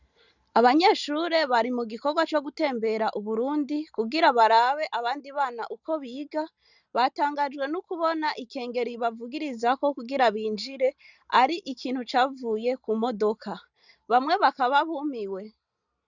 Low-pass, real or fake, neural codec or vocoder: 7.2 kHz; real; none